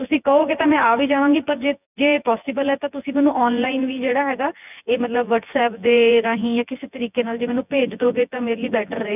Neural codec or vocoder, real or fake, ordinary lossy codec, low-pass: vocoder, 24 kHz, 100 mel bands, Vocos; fake; none; 3.6 kHz